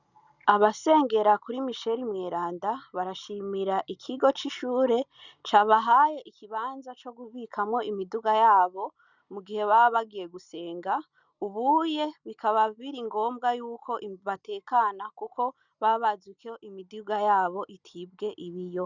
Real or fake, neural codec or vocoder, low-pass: real; none; 7.2 kHz